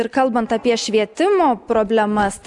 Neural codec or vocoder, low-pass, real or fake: none; 10.8 kHz; real